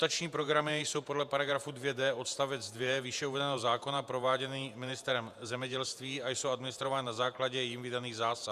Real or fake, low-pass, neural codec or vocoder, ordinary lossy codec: fake; 14.4 kHz; vocoder, 48 kHz, 128 mel bands, Vocos; AAC, 96 kbps